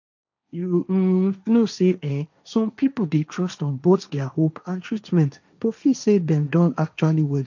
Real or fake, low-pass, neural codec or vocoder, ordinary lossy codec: fake; 7.2 kHz; codec, 16 kHz, 1.1 kbps, Voila-Tokenizer; none